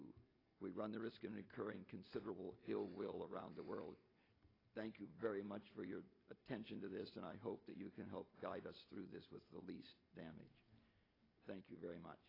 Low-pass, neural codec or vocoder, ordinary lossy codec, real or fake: 5.4 kHz; none; AAC, 24 kbps; real